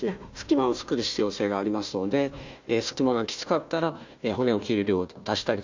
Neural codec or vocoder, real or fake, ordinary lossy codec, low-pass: codec, 16 kHz, 1 kbps, FunCodec, trained on Chinese and English, 50 frames a second; fake; MP3, 64 kbps; 7.2 kHz